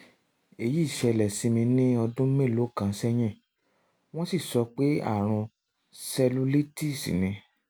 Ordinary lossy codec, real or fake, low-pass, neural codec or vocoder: none; real; none; none